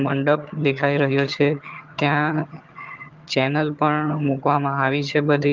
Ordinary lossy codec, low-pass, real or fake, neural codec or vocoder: Opus, 32 kbps; 7.2 kHz; fake; vocoder, 22.05 kHz, 80 mel bands, HiFi-GAN